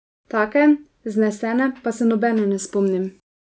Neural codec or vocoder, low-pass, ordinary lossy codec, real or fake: none; none; none; real